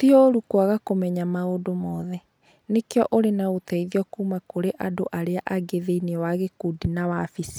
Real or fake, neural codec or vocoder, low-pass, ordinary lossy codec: real; none; none; none